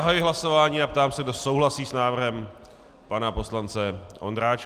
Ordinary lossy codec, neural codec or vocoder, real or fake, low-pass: Opus, 32 kbps; none; real; 14.4 kHz